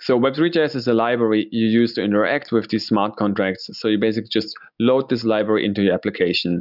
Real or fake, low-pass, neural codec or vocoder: real; 5.4 kHz; none